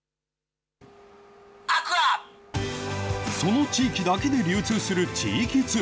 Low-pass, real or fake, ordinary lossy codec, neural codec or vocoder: none; real; none; none